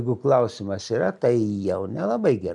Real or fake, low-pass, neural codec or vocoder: real; 10.8 kHz; none